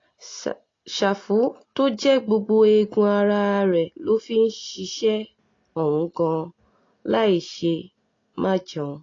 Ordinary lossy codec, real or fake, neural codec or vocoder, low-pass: AAC, 32 kbps; real; none; 7.2 kHz